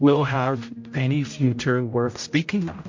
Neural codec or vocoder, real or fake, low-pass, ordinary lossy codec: codec, 16 kHz, 0.5 kbps, X-Codec, HuBERT features, trained on general audio; fake; 7.2 kHz; MP3, 48 kbps